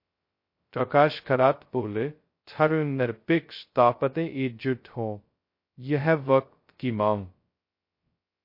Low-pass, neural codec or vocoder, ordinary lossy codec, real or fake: 5.4 kHz; codec, 16 kHz, 0.2 kbps, FocalCodec; MP3, 32 kbps; fake